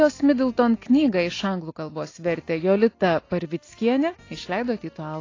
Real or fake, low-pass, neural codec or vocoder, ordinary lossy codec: real; 7.2 kHz; none; AAC, 32 kbps